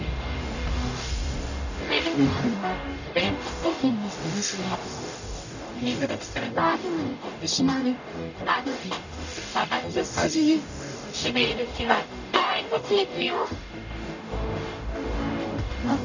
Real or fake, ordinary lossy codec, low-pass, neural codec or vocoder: fake; none; 7.2 kHz; codec, 44.1 kHz, 0.9 kbps, DAC